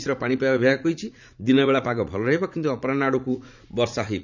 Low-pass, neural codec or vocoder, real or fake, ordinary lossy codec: 7.2 kHz; vocoder, 44.1 kHz, 80 mel bands, Vocos; fake; none